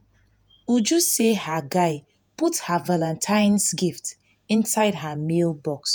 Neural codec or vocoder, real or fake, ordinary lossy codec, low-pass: vocoder, 48 kHz, 128 mel bands, Vocos; fake; none; none